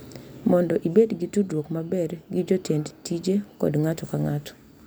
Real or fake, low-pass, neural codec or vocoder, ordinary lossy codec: real; none; none; none